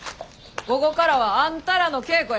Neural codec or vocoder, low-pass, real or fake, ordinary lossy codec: none; none; real; none